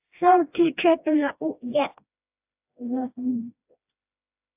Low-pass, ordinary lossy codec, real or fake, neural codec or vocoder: 3.6 kHz; AAC, 32 kbps; fake; codec, 16 kHz, 1 kbps, FreqCodec, smaller model